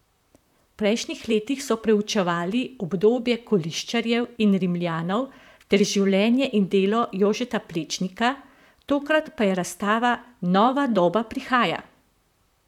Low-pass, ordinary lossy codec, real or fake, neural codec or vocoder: 19.8 kHz; none; fake; vocoder, 44.1 kHz, 128 mel bands, Pupu-Vocoder